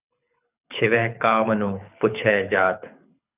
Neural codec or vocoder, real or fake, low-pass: codec, 24 kHz, 6 kbps, HILCodec; fake; 3.6 kHz